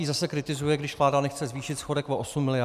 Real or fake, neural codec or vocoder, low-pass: real; none; 14.4 kHz